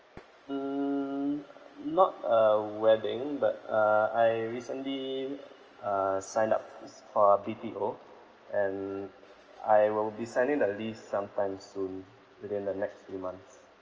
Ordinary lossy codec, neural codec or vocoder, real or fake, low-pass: Opus, 24 kbps; none; real; 7.2 kHz